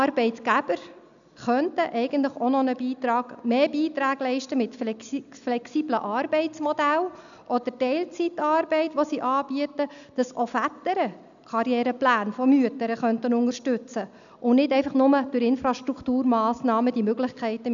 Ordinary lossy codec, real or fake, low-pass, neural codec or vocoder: none; real; 7.2 kHz; none